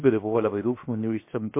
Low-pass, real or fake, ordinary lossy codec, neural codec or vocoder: 3.6 kHz; fake; MP3, 24 kbps; codec, 16 kHz, 0.7 kbps, FocalCodec